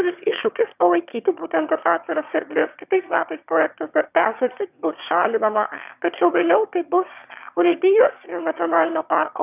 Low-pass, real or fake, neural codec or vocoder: 3.6 kHz; fake; autoencoder, 22.05 kHz, a latent of 192 numbers a frame, VITS, trained on one speaker